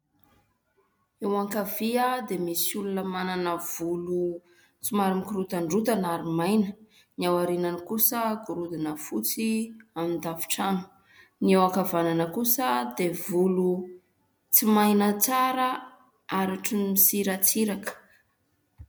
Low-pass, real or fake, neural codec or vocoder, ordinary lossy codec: 19.8 kHz; real; none; MP3, 96 kbps